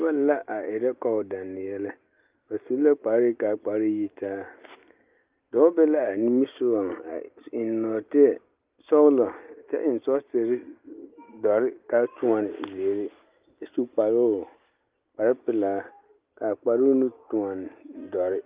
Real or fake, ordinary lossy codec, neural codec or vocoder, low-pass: real; Opus, 24 kbps; none; 3.6 kHz